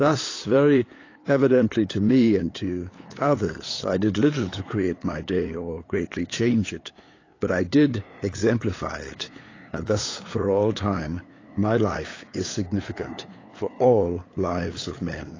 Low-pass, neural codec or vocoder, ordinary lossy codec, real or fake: 7.2 kHz; codec, 16 kHz, 8 kbps, FunCodec, trained on LibriTTS, 25 frames a second; AAC, 32 kbps; fake